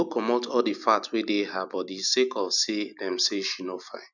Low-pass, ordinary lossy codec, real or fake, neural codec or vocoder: 7.2 kHz; none; real; none